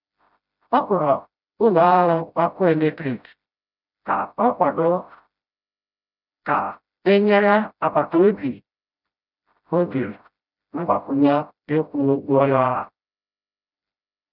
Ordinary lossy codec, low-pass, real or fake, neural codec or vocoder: none; 5.4 kHz; fake; codec, 16 kHz, 0.5 kbps, FreqCodec, smaller model